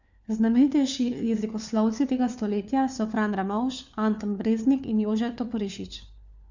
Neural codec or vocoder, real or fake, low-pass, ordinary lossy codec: codec, 16 kHz, 4 kbps, FunCodec, trained on LibriTTS, 50 frames a second; fake; 7.2 kHz; none